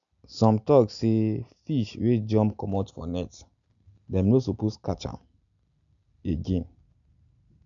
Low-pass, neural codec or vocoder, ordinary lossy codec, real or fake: 7.2 kHz; none; none; real